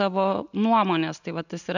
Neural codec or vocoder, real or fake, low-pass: none; real; 7.2 kHz